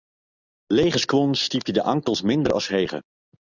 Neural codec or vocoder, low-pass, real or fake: none; 7.2 kHz; real